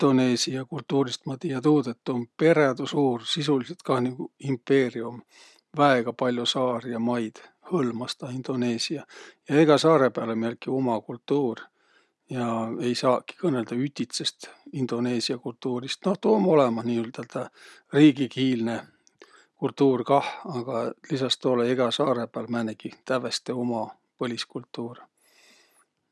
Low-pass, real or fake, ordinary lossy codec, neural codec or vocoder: none; real; none; none